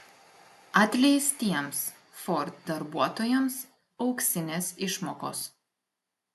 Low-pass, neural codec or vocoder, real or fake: 14.4 kHz; none; real